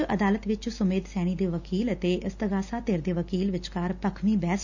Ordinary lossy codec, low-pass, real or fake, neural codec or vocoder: none; 7.2 kHz; real; none